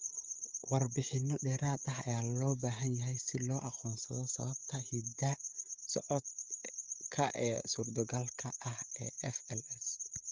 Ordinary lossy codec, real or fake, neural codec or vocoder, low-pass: Opus, 32 kbps; fake; codec, 16 kHz, 16 kbps, FreqCodec, smaller model; 7.2 kHz